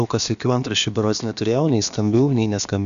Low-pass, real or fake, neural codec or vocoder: 7.2 kHz; fake; codec, 16 kHz, about 1 kbps, DyCAST, with the encoder's durations